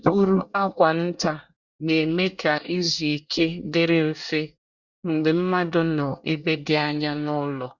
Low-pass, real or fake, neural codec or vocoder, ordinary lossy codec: 7.2 kHz; fake; codec, 24 kHz, 1 kbps, SNAC; Opus, 64 kbps